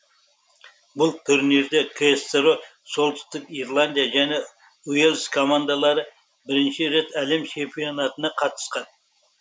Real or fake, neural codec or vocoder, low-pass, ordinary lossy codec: real; none; none; none